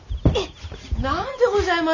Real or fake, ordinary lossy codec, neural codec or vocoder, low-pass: real; none; none; 7.2 kHz